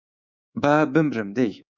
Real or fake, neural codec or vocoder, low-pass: fake; codec, 24 kHz, 3.1 kbps, DualCodec; 7.2 kHz